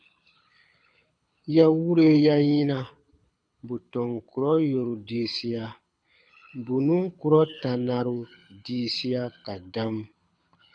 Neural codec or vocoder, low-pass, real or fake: codec, 24 kHz, 6 kbps, HILCodec; 9.9 kHz; fake